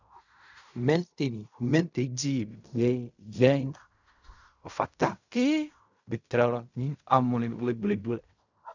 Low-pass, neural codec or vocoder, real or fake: 7.2 kHz; codec, 16 kHz in and 24 kHz out, 0.4 kbps, LongCat-Audio-Codec, fine tuned four codebook decoder; fake